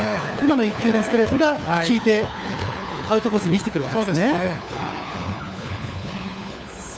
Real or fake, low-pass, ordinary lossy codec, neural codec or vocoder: fake; none; none; codec, 16 kHz, 4 kbps, FunCodec, trained on LibriTTS, 50 frames a second